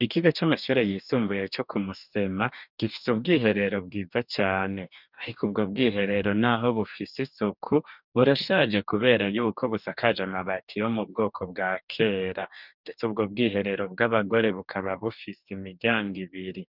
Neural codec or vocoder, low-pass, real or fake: codec, 44.1 kHz, 2.6 kbps, DAC; 5.4 kHz; fake